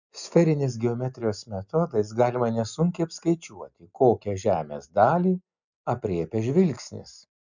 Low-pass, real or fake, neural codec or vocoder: 7.2 kHz; real; none